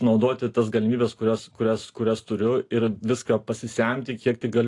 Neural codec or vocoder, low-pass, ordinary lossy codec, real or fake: none; 10.8 kHz; AAC, 48 kbps; real